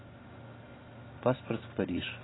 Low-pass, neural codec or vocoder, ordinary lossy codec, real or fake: 7.2 kHz; none; AAC, 16 kbps; real